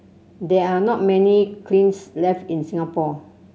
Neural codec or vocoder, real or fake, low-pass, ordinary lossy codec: none; real; none; none